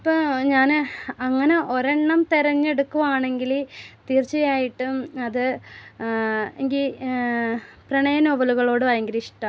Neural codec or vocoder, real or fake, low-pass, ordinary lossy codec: none; real; none; none